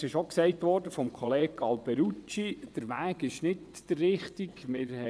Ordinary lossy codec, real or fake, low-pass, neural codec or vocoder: none; fake; none; vocoder, 22.05 kHz, 80 mel bands, WaveNeXt